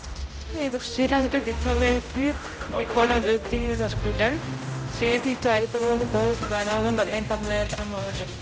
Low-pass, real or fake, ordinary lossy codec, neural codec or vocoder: none; fake; none; codec, 16 kHz, 0.5 kbps, X-Codec, HuBERT features, trained on general audio